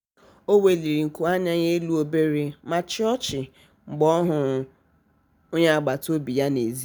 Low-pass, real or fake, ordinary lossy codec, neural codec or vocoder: none; real; none; none